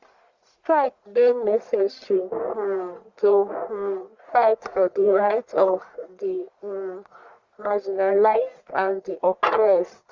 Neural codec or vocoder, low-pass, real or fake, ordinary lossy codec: codec, 44.1 kHz, 1.7 kbps, Pupu-Codec; 7.2 kHz; fake; Opus, 64 kbps